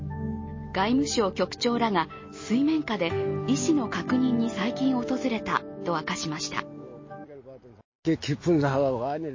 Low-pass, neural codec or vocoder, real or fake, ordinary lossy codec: 7.2 kHz; none; real; MP3, 32 kbps